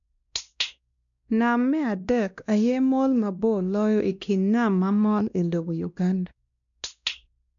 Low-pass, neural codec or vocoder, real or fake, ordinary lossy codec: 7.2 kHz; codec, 16 kHz, 1 kbps, X-Codec, WavLM features, trained on Multilingual LibriSpeech; fake; none